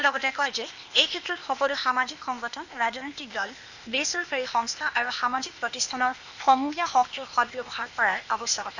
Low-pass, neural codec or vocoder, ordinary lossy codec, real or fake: 7.2 kHz; codec, 16 kHz, 0.8 kbps, ZipCodec; none; fake